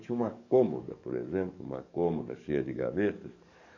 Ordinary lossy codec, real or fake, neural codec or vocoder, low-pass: none; fake; codec, 44.1 kHz, 7.8 kbps, DAC; 7.2 kHz